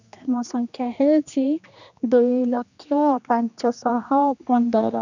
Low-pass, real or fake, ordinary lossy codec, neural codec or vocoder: 7.2 kHz; fake; none; codec, 16 kHz, 2 kbps, X-Codec, HuBERT features, trained on general audio